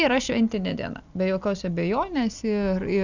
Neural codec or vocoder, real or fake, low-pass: none; real; 7.2 kHz